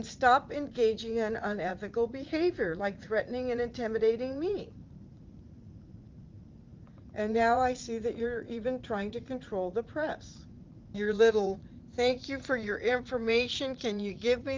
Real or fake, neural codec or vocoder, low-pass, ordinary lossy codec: fake; vocoder, 44.1 kHz, 80 mel bands, Vocos; 7.2 kHz; Opus, 32 kbps